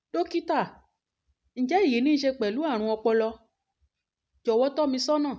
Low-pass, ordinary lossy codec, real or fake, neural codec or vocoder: none; none; real; none